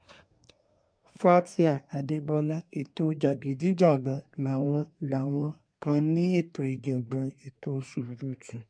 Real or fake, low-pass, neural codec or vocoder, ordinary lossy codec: fake; 9.9 kHz; codec, 24 kHz, 1 kbps, SNAC; MP3, 64 kbps